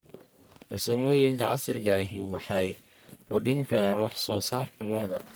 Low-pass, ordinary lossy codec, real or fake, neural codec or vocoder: none; none; fake; codec, 44.1 kHz, 1.7 kbps, Pupu-Codec